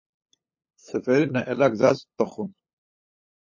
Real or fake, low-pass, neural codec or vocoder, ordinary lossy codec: fake; 7.2 kHz; codec, 16 kHz, 8 kbps, FunCodec, trained on LibriTTS, 25 frames a second; MP3, 32 kbps